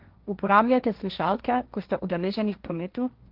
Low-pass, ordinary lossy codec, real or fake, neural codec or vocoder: 5.4 kHz; Opus, 32 kbps; fake; codec, 16 kHz, 1.1 kbps, Voila-Tokenizer